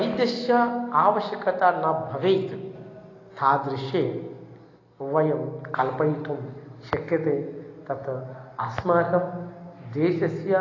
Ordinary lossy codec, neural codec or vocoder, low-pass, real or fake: AAC, 32 kbps; none; 7.2 kHz; real